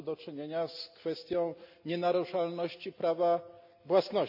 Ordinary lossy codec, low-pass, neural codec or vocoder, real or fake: none; 5.4 kHz; none; real